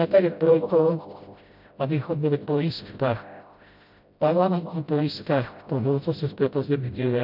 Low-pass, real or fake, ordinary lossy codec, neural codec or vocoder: 5.4 kHz; fake; MP3, 48 kbps; codec, 16 kHz, 0.5 kbps, FreqCodec, smaller model